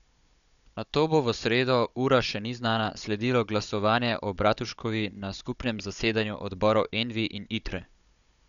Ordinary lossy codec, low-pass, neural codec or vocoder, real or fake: none; 7.2 kHz; codec, 16 kHz, 16 kbps, FunCodec, trained on Chinese and English, 50 frames a second; fake